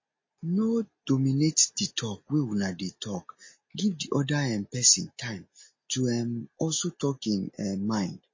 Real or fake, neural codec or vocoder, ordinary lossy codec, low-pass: real; none; MP3, 32 kbps; 7.2 kHz